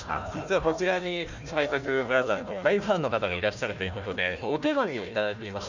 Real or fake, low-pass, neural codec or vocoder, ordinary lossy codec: fake; 7.2 kHz; codec, 16 kHz, 1 kbps, FunCodec, trained on Chinese and English, 50 frames a second; none